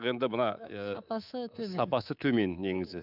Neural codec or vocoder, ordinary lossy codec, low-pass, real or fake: codec, 24 kHz, 3.1 kbps, DualCodec; none; 5.4 kHz; fake